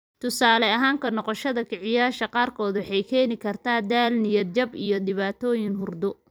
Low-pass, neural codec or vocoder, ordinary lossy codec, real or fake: none; vocoder, 44.1 kHz, 128 mel bands every 256 samples, BigVGAN v2; none; fake